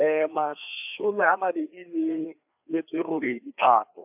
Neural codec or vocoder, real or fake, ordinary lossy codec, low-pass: codec, 16 kHz, 2 kbps, FreqCodec, larger model; fake; AAC, 32 kbps; 3.6 kHz